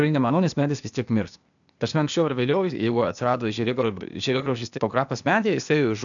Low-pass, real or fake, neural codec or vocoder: 7.2 kHz; fake; codec, 16 kHz, 0.8 kbps, ZipCodec